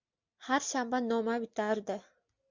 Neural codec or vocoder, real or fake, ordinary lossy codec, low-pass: none; real; MP3, 64 kbps; 7.2 kHz